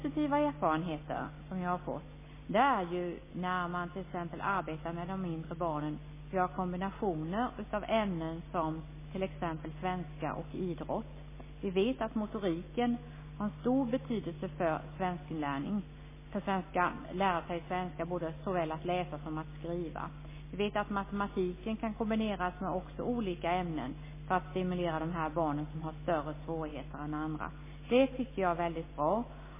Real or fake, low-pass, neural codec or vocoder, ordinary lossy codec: real; 3.6 kHz; none; MP3, 16 kbps